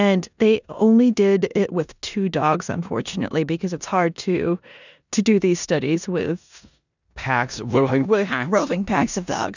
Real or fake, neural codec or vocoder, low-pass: fake; codec, 16 kHz in and 24 kHz out, 0.9 kbps, LongCat-Audio-Codec, four codebook decoder; 7.2 kHz